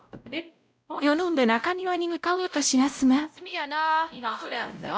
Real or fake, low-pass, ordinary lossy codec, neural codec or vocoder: fake; none; none; codec, 16 kHz, 0.5 kbps, X-Codec, WavLM features, trained on Multilingual LibriSpeech